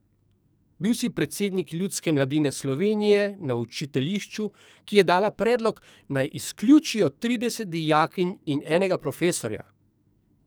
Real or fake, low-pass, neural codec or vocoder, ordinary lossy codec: fake; none; codec, 44.1 kHz, 2.6 kbps, SNAC; none